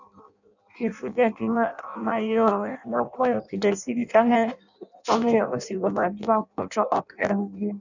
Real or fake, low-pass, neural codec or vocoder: fake; 7.2 kHz; codec, 16 kHz in and 24 kHz out, 0.6 kbps, FireRedTTS-2 codec